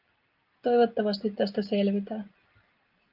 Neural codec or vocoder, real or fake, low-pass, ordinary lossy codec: none; real; 5.4 kHz; Opus, 24 kbps